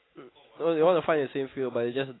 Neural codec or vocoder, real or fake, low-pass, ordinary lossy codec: none; real; 7.2 kHz; AAC, 16 kbps